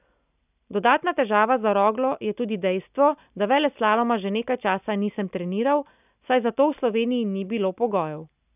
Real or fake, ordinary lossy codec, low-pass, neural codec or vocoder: real; none; 3.6 kHz; none